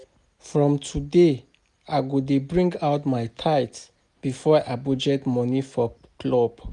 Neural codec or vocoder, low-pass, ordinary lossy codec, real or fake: none; 10.8 kHz; none; real